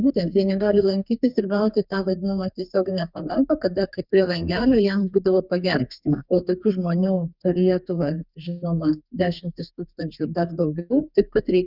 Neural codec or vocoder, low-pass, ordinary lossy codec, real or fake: codec, 32 kHz, 1.9 kbps, SNAC; 5.4 kHz; Opus, 64 kbps; fake